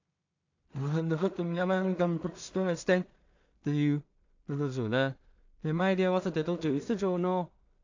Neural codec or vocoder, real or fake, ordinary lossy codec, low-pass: codec, 16 kHz in and 24 kHz out, 0.4 kbps, LongCat-Audio-Codec, two codebook decoder; fake; AAC, 48 kbps; 7.2 kHz